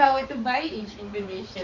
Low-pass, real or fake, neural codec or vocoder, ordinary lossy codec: 7.2 kHz; fake; codec, 16 kHz, 4 kbps, X-Codec, HuBERT features, trained on balanced general audio; Opus, 64 kbps